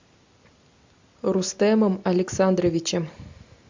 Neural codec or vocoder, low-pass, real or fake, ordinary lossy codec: none; 7.2 kHz; real; MP3, 64 kbps